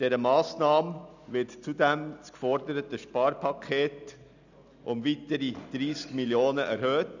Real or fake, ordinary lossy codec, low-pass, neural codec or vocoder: real; none; 7.2 kHz; none